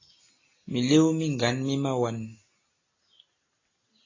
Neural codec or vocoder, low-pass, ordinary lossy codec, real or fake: none; 7.2 kHz; AAC, 32 kbps; real